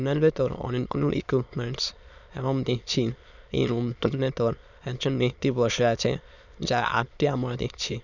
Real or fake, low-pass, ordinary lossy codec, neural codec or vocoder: fake; 7.2 kHz; none; autoencoder, 22.05 kHz, a latent of 192 numbers a frame, VITS, trained on many speakers